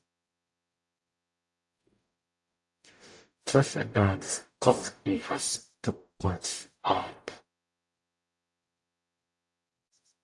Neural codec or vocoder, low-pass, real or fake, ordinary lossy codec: codec, 44.1 kHz, 0.9 kbps, DAC; 10.8 kHz; fake; AAC, 64 kbps